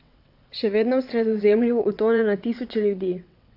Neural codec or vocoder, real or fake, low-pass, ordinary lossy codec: vocoder, 22.05 kHz, 80 mel bands, WaveNeXt; fake; 5.4 kHz; AAC, 48 kbps